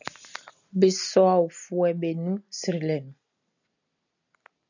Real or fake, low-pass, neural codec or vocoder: real; 7.2 kHz; none